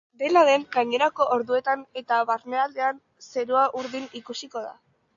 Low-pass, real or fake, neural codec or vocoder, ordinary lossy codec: 7.2 kHz; real; none; AAC, 64 kbps